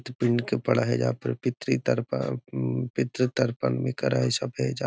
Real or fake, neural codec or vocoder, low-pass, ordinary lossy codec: real; none; none; none